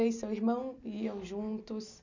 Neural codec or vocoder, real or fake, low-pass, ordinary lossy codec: none; real; 7.2 kHz; none